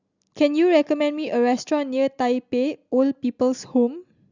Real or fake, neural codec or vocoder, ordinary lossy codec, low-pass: real; none; Opus, 64 kbps; 7.2 kHz